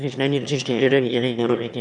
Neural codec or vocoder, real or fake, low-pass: autoencoder, 22.05 kHz, a latent of 192 numbers a frame, VITS, trained on one speaker; fake; 9.9 kHz